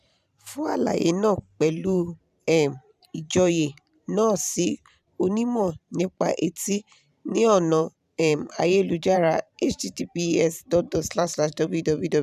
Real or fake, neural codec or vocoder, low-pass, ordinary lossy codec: fake; vocoder, 44.1 kHz, 128 mel bands every 512 samples, BigVGAN v2; 14.4 kHz; none